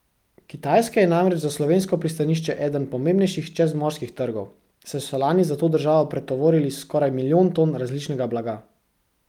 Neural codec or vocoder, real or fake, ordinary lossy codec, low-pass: none; real; Opus, 32 kbps; 19.8 kHz